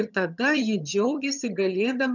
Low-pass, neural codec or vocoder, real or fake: 7.2 kHz; vocoder, 22.05 kHz, 80 mel bands, HiFi-GAN; fake